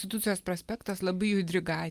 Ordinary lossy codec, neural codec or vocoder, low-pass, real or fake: Opus, 32 kbps; none; 14.4 kHz; real